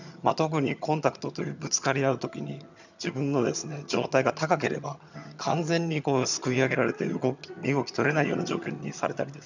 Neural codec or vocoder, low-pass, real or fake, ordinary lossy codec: vocoder, 22.05 kHz, 80 mel bands, HiFi-GAN; 7.2 kHz; fake; none